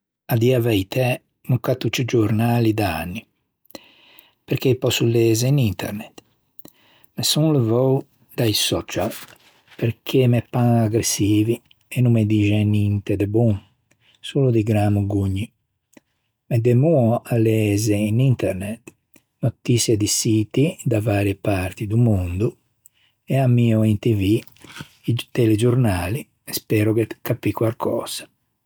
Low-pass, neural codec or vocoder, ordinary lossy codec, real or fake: none; none; none; real